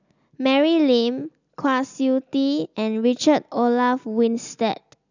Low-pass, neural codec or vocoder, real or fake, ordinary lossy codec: 7.2 kHz; none; real; none